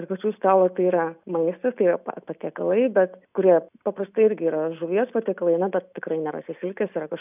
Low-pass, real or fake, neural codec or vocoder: 3.6 kHz; real; none